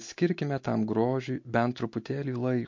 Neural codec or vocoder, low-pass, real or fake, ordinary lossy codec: none; 7.2 kHz; real; MP3, 48 kbps